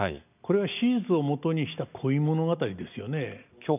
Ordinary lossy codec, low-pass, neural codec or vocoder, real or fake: none; 3.6 kHz; none; real